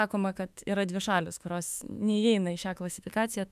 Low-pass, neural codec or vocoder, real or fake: 14.4 kHz; autoencoder, 48 kHz, 32 numbers a frame, DAC-VAE, trained on Japanese speech; fake